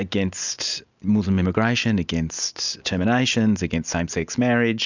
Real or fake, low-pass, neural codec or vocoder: real; 7.2 kHz; none